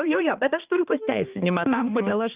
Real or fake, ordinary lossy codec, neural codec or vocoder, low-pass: fake; Opus, 24 kbps; codec, 16 kHz, 2 kbps, X-Codec, HuBERT features, trained on balanced general audio; 3.6 kHz